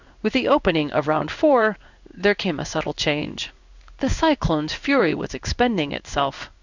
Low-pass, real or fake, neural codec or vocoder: 7.2 kHz; fake; codec, 16 kHz in and 24 kHz out, 1 kbps, XY-Tokenizer